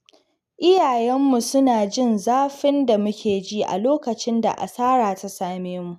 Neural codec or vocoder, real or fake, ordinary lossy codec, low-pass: none; real; none; 14.4 kHz